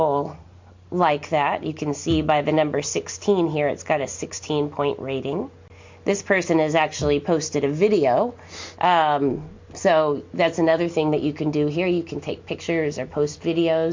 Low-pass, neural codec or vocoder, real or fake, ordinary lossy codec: 7.2 kHz; none; real; MP3, 48 kbps